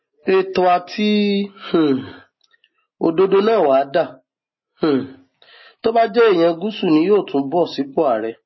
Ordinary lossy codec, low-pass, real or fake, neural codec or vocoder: MP3, 24 kbps; 7.2 kHz; real; none